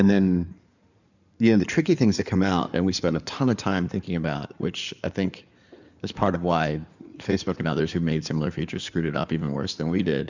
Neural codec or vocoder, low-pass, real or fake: codec, 16 kHz in and 24 kHz out, 2.2 kbps, FireRedTTS-2 codec; 7.2 kHz; fake